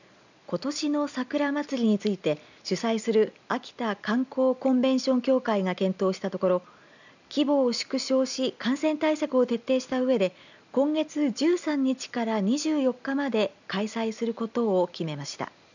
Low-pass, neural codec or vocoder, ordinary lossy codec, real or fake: 7.2 kHz; none; none; real